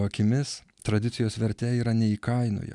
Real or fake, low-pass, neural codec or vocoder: real; 10.8 kHz; none